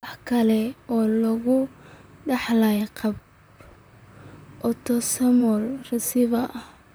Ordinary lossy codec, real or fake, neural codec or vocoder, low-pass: none; fake; vocoder, 44.1 kHz, 128 mel bands, Pupu-Vocoder; none